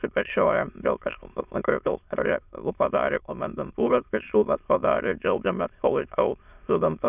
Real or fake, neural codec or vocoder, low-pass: fake; autoencoder, 22.05 kHz, a latent of 192 numbers a frame, VITS, trained on many speakers; 3.6 kHz